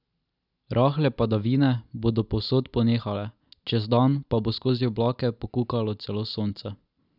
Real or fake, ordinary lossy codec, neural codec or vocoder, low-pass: real; none; none; 5.4 kHz